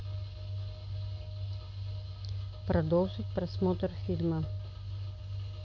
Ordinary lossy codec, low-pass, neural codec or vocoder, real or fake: AAC, 48 kbps; 7.2 kHz; none; real